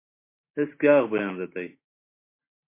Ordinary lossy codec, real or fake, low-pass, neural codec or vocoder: MP3, 24 kbps; real; 3.6 kHz; none